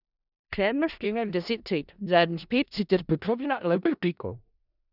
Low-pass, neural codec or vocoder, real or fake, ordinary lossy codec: 5.4 kHz; codec, 16 kHz in and 24 kHz out, 0.4 kbps, LongCat-Audio-Codec, four codebook decoder; fake; none